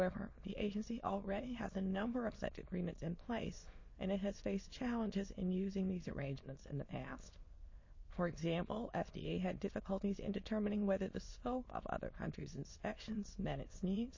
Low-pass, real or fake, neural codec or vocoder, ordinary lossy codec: 7.2 kHz; fake; autoencoder, 22.05 kHz, a latent of 192 numbers a frame, VITS, trained on many speakers; MP3, 32 kbps